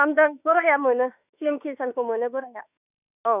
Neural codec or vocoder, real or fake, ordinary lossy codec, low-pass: autoencoder, 48 kHz, 32 numbers a frame, DAC-VAE, trained on Japanese speech; fake; none; 3.6 kHz